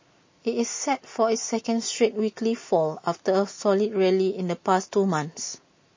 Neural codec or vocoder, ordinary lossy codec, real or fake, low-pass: none; MP3, 32 kbps; real; 7.2 kHz